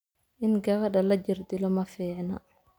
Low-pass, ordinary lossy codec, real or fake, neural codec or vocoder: none; none; real; none